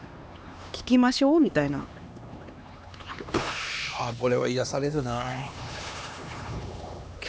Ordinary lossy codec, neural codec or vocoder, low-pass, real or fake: none; codec, 16 kHz, 2 kbps, X-Codec, HuBERT features, trained on LibriSpeech; none; fake